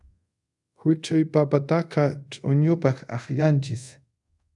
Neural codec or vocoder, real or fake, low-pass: codec, 24 kHz, 0.5 kbps, DualCodec; fake; 10.8 kHz